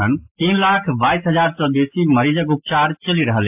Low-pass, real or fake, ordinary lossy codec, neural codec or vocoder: 3.6 kHz; real; AAC, 32 kbps; none